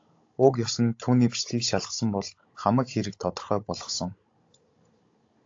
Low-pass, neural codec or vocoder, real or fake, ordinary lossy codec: 7.2 kHz; codec, 16 kHz, 16 kbps, FunCodec, trained on Chinese and English, 50 frames a second; fake; AAC, 48 kbps